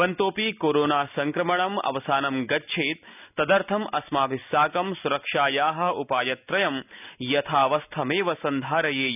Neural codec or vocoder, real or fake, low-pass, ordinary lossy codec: none; real; 3.6 kHz; none